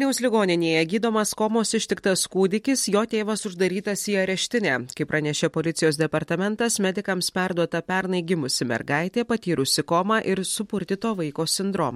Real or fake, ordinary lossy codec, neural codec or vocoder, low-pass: real; MP3, 64 kbps; none; 19.8 kHz